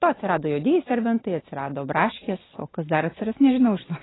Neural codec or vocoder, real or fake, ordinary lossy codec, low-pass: none; real; AAC, 16 kbps; 7.2 kHz